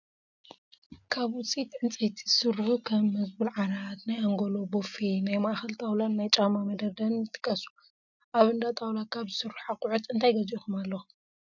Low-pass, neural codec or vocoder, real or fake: 7.2 kHz; none; real